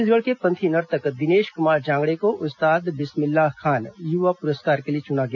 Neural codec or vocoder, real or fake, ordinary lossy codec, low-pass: none; real; none; 7.2 kHz